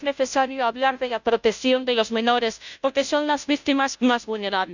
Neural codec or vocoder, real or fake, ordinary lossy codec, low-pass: codec, 16 kHz, 0.5 kbps, FunCodec, trained on Chinese and English, 25 frames a second; fake; none; 7.2 kHz